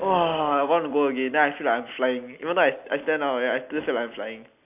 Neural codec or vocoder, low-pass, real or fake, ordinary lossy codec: none; 3.6 kHz; real; AAC, 32 kbps